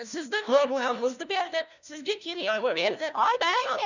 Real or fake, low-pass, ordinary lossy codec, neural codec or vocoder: fake; 7.2 kHz; none; codec, 16 kHz, 1 kbps, FunCodec, trained on LibriTTS, 50 frames a second